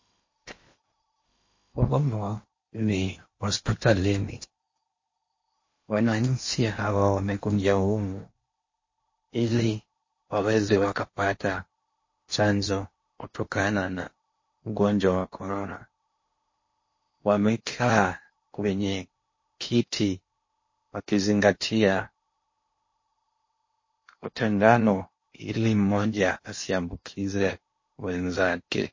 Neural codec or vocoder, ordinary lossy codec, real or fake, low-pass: codec, 16 kHz in and 24 kHz out, 0.8 kbps, FocalCodec, streaming, 65536 codes; MP3, 32 kbps; fake; 7.2 kHz